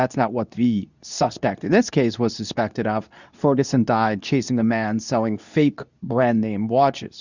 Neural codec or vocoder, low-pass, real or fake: codec, 24 kHz, 0.9 kbps, WavTokenizer, medium speech release version 2; 7.2 kHz; fake